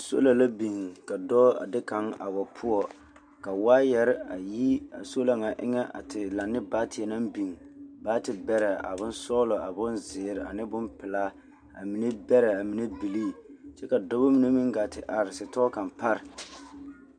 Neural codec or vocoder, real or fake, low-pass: none; real; 9.9 kHz